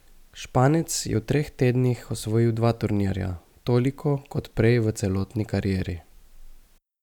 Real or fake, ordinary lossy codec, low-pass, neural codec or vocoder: real; none; 19.8 kHz; none